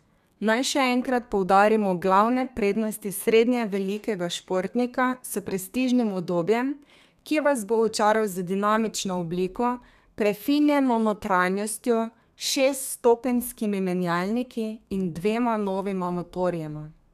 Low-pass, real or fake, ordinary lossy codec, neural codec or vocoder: 14.4 kHz; fake; none; codec, 32 kHz, 1.9 kbps, SNAC